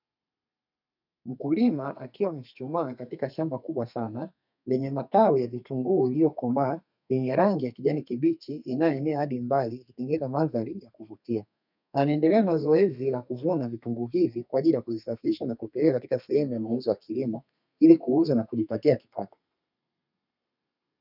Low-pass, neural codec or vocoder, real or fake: 5.4 kHz; codec, 32 kHz, 1.9 kbps, SNAC; fake